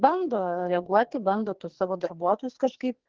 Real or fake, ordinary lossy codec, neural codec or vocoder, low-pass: fake; Opus, 16 kbps; codec, 44.1 kHz, 2.6 kbps, SNAC; 7.2 kHz